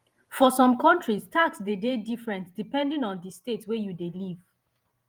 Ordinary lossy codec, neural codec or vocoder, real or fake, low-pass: Opus, 32 kbps; vocoder, 48 kHz, 128 mel bands, Vocos; fake; 19.8 kHz